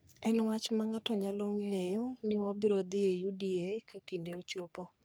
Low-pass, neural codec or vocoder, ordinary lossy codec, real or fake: none; codec, 44.1 kHz, 3.4 kbps, Pupu-Codec; none; fake